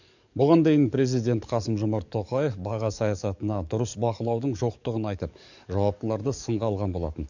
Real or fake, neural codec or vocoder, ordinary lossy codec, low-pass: fake; codec, 44.1 kHz, 7.8 kbps, DAC; none; 7.2 kHz